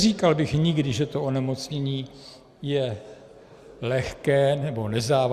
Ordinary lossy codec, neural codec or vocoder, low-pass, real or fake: Opus, 64 kbps; none; 14.4 kHz; real